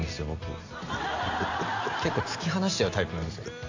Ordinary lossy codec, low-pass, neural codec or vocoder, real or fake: AAC, 48 kbps; 7.2 kHz; none; real